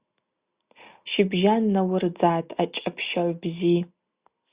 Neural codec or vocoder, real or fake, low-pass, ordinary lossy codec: none; real; 3.6 kHz; Opus, 64 kbps